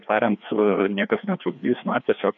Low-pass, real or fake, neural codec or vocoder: 7.2 kHz; fake; codec, 16 kHz, 2 kbps, FreqCodec, larger model